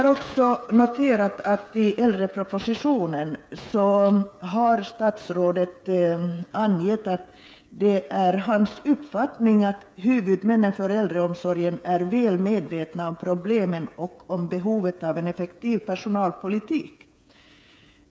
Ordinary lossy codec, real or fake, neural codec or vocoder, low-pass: none; fake; codec, 16 kHz, 8 kbps, FreqCodec, smaller model; none